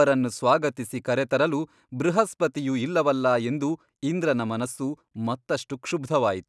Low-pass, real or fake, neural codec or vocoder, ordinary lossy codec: none; real; none; none